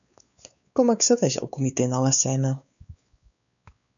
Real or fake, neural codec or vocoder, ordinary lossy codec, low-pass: fake; codec, 16 kHz, 4 kbps, X-Codec, WavLM features, trained on Multilingual LibriSpeech; MP3, 96 kbps; 7.2 kHz